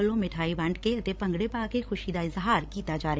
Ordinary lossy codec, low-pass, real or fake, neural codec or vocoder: none; none; fake; codec, 16 kHz, 16 kbps, FreqCodec, larger model